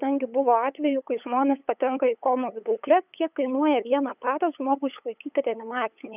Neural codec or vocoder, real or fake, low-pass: codec, 16 kHz, 8 kbps, FunCodec, trained on LibriTTS, 25 frames a second; fake; 3.6 kHz